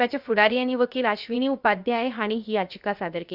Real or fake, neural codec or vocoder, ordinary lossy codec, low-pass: fake; codec, 16 kHz, 0.7 kbps, FocalCodec; Opus, 64 kbps; 5.4 kHz